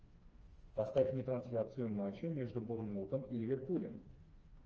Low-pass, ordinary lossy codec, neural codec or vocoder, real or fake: 7.2 kHz; Opus, 24 kbps; codec, 16 kHz, 2 kbps, FreqCodec, smaller model; fake